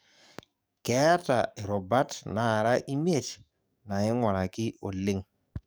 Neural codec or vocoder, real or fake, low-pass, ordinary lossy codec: codec, 44.1 kHz, 7.8 kbps, DAC; fake; none; none